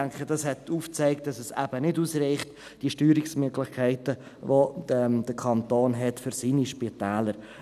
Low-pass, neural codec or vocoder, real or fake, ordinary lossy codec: 14.4 kHz; none; real; none